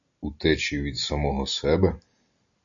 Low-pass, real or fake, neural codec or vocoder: 7.2 kHz; real; none